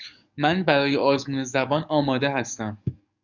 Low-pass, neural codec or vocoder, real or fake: 7.2 kHz; codec, 44.1 kHz, 7.8 kbps, DAC; fake